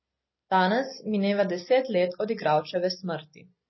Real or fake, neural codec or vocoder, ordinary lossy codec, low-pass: real; none; MP3, 24 kbps; 7.2 kHz